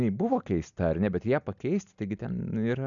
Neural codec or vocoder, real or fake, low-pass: none; real; 7.2 kHz